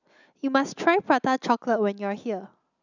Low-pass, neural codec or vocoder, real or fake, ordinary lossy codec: 7.2 kHz; none; real; none